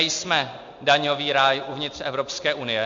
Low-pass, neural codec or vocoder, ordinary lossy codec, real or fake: 7.2 kHz; none; MP3, 48 kbps; real